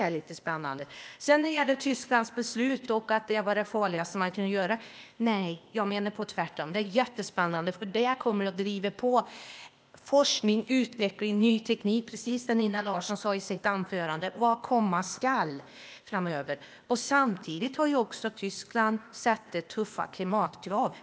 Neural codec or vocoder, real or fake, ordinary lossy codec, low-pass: codec, 16 kHz, 0.8 kbps, ZipCodec; fake; none; none